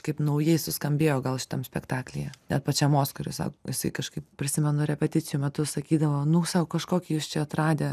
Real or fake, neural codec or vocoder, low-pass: real; none; 14.4 kHz